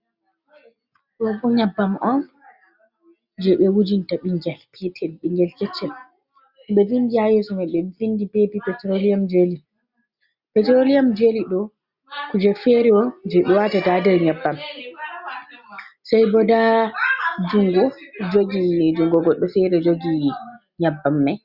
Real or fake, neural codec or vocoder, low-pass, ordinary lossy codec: real; none; 5.4 kHz; Opus, 64 kbps